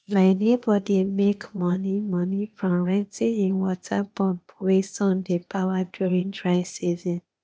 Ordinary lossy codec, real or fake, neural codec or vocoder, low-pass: none; fake; codec, 16 kHz, 0.8 kbps, ZipCodec; none